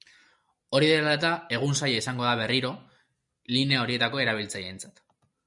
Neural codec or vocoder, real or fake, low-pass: none; real; 10.8 kHz